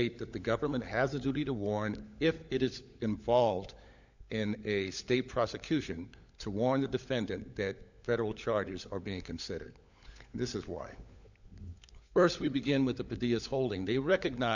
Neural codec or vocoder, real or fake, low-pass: codec, 16 kHz, 16 kbps, FunCodec, trained on LibriTTS, 50 frames a second; fake; 7.2 kHz